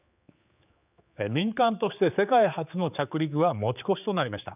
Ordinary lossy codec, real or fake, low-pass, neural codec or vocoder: none; fake; 3.6 kHz; codec, 16 kHz, 4 kbps, X-Codec, HuBERT features, trained on general audio